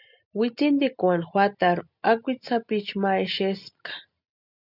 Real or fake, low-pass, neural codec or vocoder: real; 5.4 kHz; none